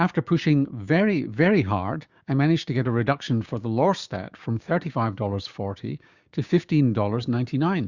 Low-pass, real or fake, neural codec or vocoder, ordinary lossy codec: 7.2 kHz; fake; codec, 16 kHz, 6 kbps, DAC; Opus, 64 kbps